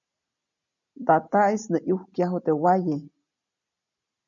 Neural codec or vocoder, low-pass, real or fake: none; 7.2 kHz; real